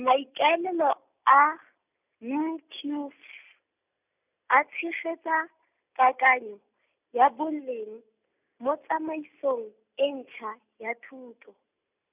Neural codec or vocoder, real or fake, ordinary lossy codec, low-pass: none; real; none; 3.6 kHz